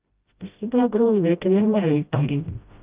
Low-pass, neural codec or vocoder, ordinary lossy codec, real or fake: 3.6 kHz; codec, 16 kHz, 0.5 kbps, FreqCodec, smaller model; Opus, 24 kbps; fake